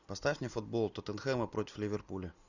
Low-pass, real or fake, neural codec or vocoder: 7.2 kHz; real; none